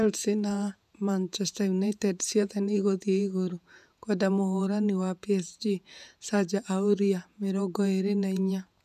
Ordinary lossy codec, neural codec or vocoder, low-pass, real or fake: none; vocoder, 48 kHz, 128 mel bands, Vocos; 14.4 kHz; fake